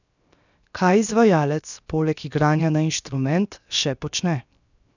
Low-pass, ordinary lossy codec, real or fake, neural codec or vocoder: 7.2 kHz; none; fake; codec, 16 kHz, 0.7 kbps, FocalCodec